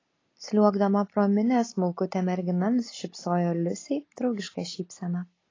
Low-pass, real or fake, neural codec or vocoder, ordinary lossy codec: 7.2 kHz; real; none; AAC, 32 kbps